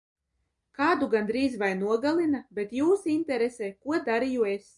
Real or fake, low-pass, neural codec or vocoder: real; 10.8 kHz; none